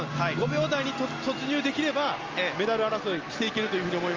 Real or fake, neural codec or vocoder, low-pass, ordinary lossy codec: real; none; 7.2 kHz; Opus, 32 kbps